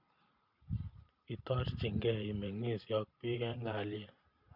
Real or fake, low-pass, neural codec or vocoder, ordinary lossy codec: fake; 5.4 kHz; vocoder, 44.1 kHz, 128 mel bands every 512 samples, BigVGAN v2; none